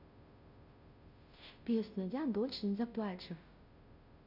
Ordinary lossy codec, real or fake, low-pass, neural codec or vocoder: none; fake; 5.4 kHz; codec, 16 kHz, 0.5 kbps, FunCodec, trained on Chinese and English, 25 frames a second